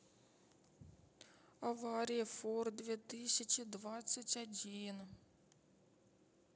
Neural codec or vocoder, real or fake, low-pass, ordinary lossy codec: none; real; none; none